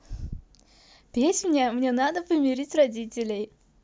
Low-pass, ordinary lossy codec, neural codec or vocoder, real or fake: none; none; none; real